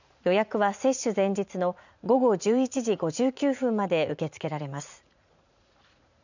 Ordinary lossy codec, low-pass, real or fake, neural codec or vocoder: none; 7.2 kHz; fake; vocoder, 44.1 kHz, 80 mel bands, Vocos